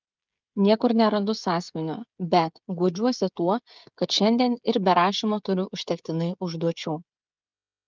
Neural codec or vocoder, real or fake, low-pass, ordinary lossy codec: codec, 16 kHz, 16 kbps, FreqCodec, smaller model; fake; 7.2 kHz; Opus, 24 kbps